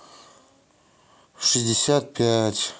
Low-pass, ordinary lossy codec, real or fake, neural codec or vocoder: none; none; real; none